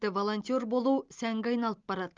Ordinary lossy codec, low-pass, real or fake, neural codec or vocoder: Opus, 32 kbps; 7.2 kHz; real; none